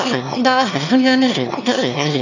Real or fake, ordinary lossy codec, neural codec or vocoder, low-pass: fake; none; autoencoder, 22.05 kHz, a latent of 192 numbers a frame, VITS, trained on one speaker; 7.2 kHz